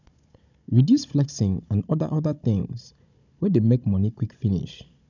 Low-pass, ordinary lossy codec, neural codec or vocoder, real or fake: 7.2 kHz; none; codec, 16 kHz, 16 kbps, FunCodec, trained on Chinese and English, 50 frames a second; fake